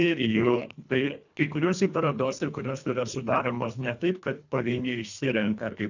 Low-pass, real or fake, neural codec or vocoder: 7.2 kHz; fake; codec, 24 kHz, 1.5 kbps, HILCodec